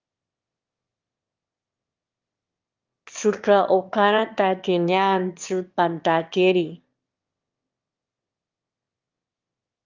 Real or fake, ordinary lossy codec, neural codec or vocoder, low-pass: fake; Opus, 24 kbps; autoencoder, 22.05 kHz, a latent of 192 numbers a frame, VITS, trained on one speaker; 7.2 kHz